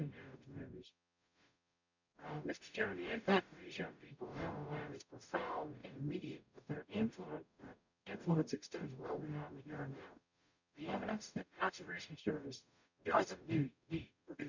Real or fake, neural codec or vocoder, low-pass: fake; codec, 44.1 kHz, 0.9 kbps, DAC; 7.2 kHz